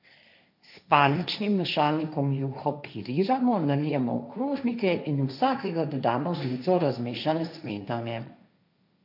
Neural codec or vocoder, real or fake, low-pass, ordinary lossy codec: codec, 16 kHz, 1.1 kbps, Voila-Tokenizer; fake; 5.4 kHz; none